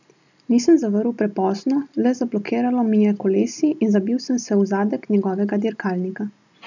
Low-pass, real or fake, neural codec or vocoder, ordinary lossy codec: 7.2 kHz; real; none; none